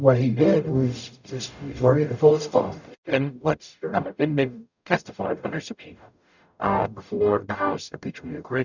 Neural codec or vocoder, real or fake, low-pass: codec, 44.1 kHz, 0.9 kbps, DAC; fake; 7.2 kHz